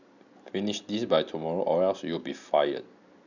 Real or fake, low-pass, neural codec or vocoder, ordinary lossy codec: real; 7.2 kHz; none; none